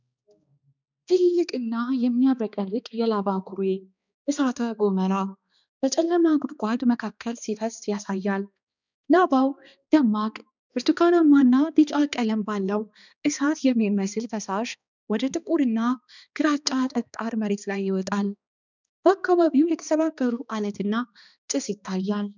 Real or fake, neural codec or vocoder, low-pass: fake; codec, 16 kHz, 2 kbps, X-Codec, HuBERT features, trained on balanced general audio; 7.2 kHz